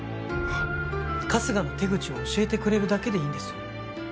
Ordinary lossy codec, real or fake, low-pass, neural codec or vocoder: none; real; none; none